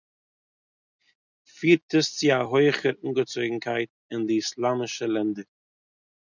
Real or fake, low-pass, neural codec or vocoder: real; 7.2 kHz; none